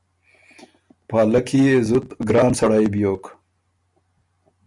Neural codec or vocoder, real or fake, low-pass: none; real; 10.8 kHz